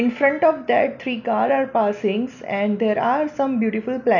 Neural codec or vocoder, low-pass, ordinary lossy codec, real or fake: none; 7.2 kHz; none; real